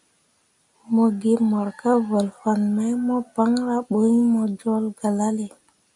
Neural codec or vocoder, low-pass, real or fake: none; 10.8 kHz; real